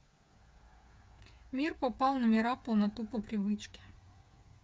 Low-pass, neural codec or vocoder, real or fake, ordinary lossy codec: none; codec, 16 kHz, 8 kbps, FreqCodec, smaller model; fake; none